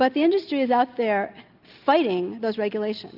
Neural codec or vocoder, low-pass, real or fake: none; 5.4 kHz; real